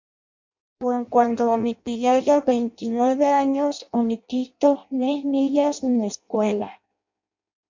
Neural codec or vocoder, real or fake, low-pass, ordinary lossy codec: codec, 16 kHz in and 24 kHz out, 0.6 kbps, FireRedTTS-2 codec; fake; 7.2 kHz; MP3, 64 kbps